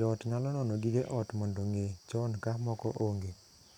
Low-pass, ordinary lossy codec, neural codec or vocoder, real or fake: 19.8 kHz; none; vocoder, 44.1 kHz, 128 mel bands every 512 samples, BigVGAN v2; fake